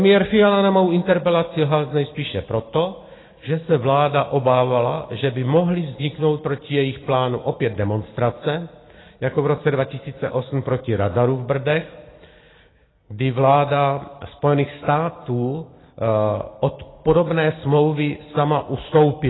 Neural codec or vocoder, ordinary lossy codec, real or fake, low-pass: none; AAC, 16 kbps; real; 7.2 kHz